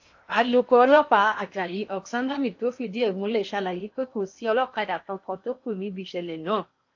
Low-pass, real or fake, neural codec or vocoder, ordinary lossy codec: 7.2 kHz; fake; codec, 16 kHz in and 24 kHz out, 0.8 kbps, FocalCodec, streaming, 65536 codes; AAC, 48 kbps